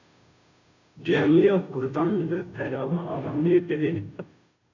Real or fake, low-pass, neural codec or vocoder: fake; 7.2 kHz; codec, 16 kHz, 0.5 kbps, FunCodec, trained on Chinese and English, 25 frames a second